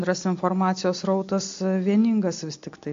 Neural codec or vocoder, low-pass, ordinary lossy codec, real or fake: none; 7.2 kHz; AAC, 48 kbps; real